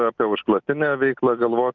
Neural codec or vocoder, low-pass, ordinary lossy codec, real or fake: none; 7.2 kHz; Opus, 24 kbps; real